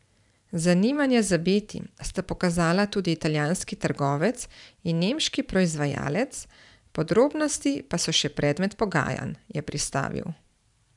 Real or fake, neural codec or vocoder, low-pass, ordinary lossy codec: fake; vocoder, 44.1 kHz, 128 mel bands every 512 samples, BigVGAN v2; 10.8 kHz; none